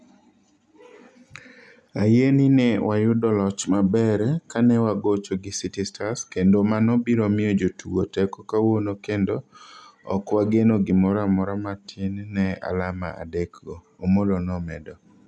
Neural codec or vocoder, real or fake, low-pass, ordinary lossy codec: none; real; none; none